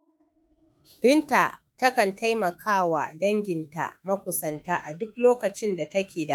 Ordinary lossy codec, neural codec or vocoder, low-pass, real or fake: none; autoencoder, 48 kHz, 32 numbers a frame, DAC-VAE, trained on Japanese speech; none; fake